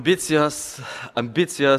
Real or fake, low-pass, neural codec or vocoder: real; 14.4 kHz; none